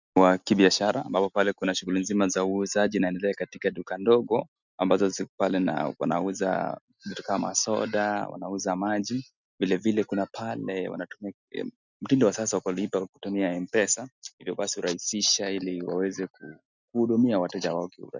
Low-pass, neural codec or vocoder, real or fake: 7.2 kHz; none; real